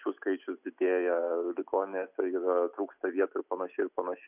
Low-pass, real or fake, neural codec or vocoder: 3.6 kHz; real; none